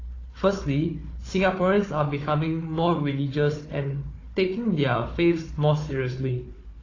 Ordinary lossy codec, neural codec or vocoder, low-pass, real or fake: AAC, 32 kbps; codec, 16 kHz, 4 kbps, FunCodec, trained on Chinese and English, 50 frames a second; 7.2 kHz; fake